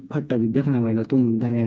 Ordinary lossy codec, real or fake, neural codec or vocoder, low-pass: none; fake; codec, 16 kHz, 2 kbps, FreqCodec, smaller model; none